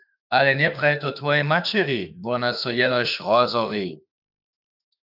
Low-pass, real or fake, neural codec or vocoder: 5.4 kHz; fake; autoencoder, 48 kHz, 32 numbers a frame, DAC-VAE, trained on Japanese speech